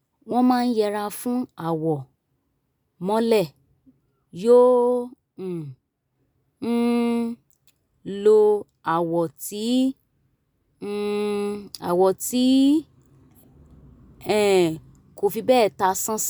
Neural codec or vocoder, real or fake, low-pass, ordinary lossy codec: none; real; none; none